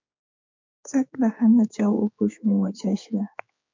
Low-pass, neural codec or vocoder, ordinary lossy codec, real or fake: 7.2 kHz; codec, 16 kHz, 4 kbps, X-Codec, HuBERT features, trained on general audio; MP3, 48 kbps; fake